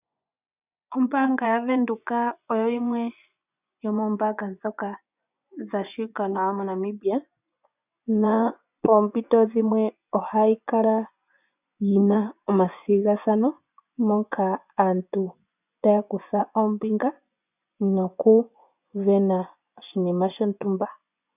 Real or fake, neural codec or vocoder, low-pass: fake; vocoder, 24 kHz, 100 mel bands, Vocos; 3.6 kHz